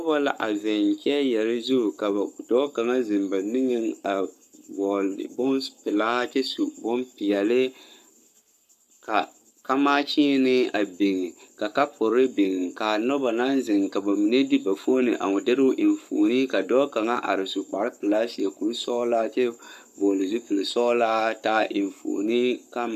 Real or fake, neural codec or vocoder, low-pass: fake; codec, 44.1 kHz, 7.8 kbps, Pupu-Codec; 14.4 kHz